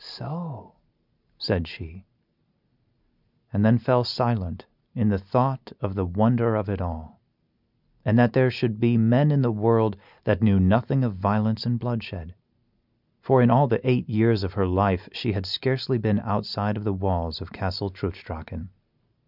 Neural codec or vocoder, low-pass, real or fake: none; 5.4 kHz; real